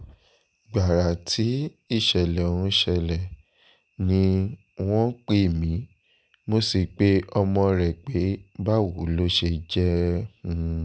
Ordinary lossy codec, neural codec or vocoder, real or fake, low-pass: none; none; real; none